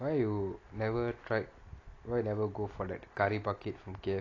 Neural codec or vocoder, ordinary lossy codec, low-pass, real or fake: none; AAC, 32 kbps; 7.2 kHz; real